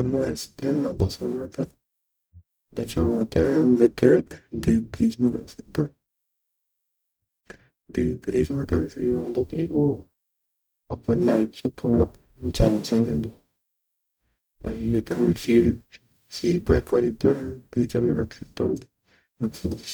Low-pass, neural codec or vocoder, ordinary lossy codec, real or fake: none; codec, 44.1 kHz, 0.9 kbps, DAC; none; fake